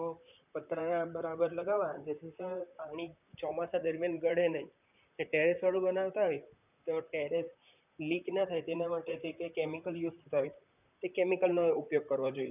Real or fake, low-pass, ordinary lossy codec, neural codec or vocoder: fake; 3.6 kHz; none; vocoder, 44.1 kHz, 128 mel bands, Pupu-Vocoder